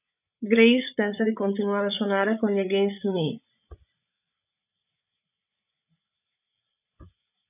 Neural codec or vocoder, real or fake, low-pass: codec, 16 kHz, 8 kbps, FreqCodec, larger model; fake; 3.6 kHz